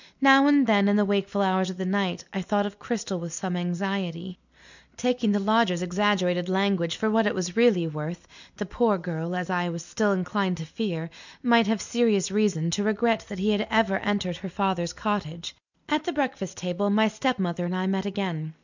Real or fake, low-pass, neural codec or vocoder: real; 7.2 kHz; none